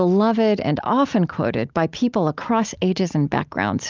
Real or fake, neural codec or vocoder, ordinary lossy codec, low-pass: real; none; Opus, 24 kbps; 7.2 kHz